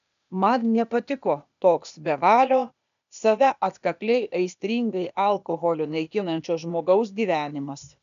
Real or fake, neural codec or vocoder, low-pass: fake; codec, 16 kHz, 0.8 kbps, ZipCodec; 7.2 kHz